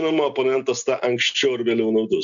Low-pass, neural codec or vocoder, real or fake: 7.2 kHz; none; real